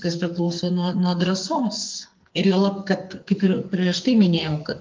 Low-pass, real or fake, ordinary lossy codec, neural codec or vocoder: 7.2 kHz; fake; Opus, 32 kbps; codec, 44.1 kHz, 2.6 kbps, SNAC